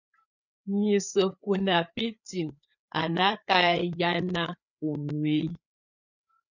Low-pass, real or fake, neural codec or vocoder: 7.2 kHz; fake; codec, 16 kHz, 4 kbps, FreqCodec, larger model